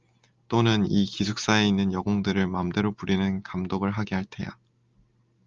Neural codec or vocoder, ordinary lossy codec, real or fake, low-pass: none; Opus, 32 kbps; real; 7.2 kHz